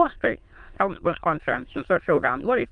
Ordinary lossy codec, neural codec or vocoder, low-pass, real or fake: Opus, 24 kbps; autoencoder, 22.05 kHz, a latent of 192 numbers a frame, VITS, trained on many speakers; 9.9 kHz; fake